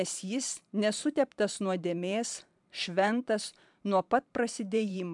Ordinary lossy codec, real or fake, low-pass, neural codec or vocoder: MP3, 96 kbps; fake; 10.8 kHz; vocoder, 44.1 kHz, 128 mel bands every 512 samples, BigVGAN v2